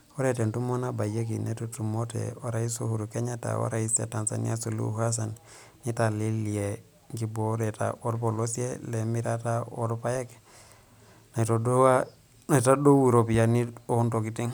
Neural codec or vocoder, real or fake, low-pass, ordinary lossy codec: none; real; none; none